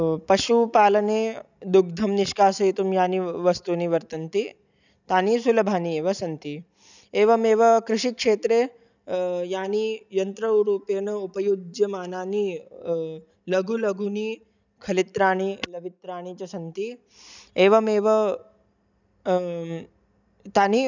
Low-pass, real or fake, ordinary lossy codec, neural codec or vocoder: 7.2 kHz; real; none; none